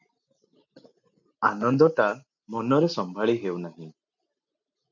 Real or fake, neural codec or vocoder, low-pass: real; none; 7.2 kHz